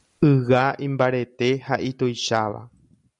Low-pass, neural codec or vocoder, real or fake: 10.8 kHz; none; real